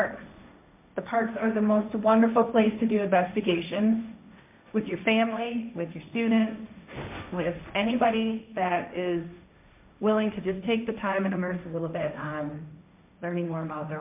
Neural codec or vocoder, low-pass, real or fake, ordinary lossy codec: codec, 16 kHz, 1.1 kbps, Voila-Tokenizer; 3.6 kHz; fake; MP3, 32 kbps